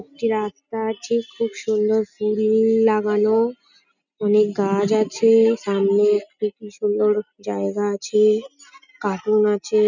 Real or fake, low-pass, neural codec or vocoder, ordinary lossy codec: real; 7.2 kHz; none; none